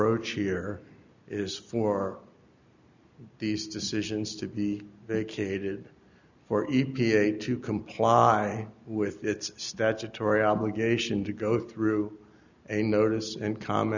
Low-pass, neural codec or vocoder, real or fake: 7.2 kHz; none; real